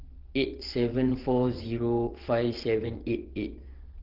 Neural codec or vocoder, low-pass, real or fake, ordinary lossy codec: vocoder, 22.05 kHz, 80 mel bands, WaveNeXt; 5.4 kHz; fake; Opus, 16 kbps